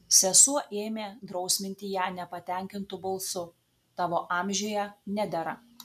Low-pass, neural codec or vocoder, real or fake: 14.4 kHz; none; real